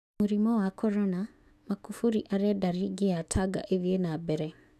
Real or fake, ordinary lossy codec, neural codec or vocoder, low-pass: fake; none; autoencoder, 48 kHz, 128 numbers a frame, DAC-VAE, trained on Japanese speech; 14.4 kHz